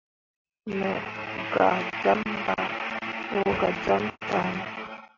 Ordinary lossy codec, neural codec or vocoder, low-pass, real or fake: AAC, 32 kbps; none; 7.2 kHz; real